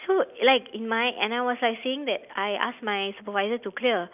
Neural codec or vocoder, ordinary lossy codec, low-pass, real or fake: none; none; 3.6 kHz; real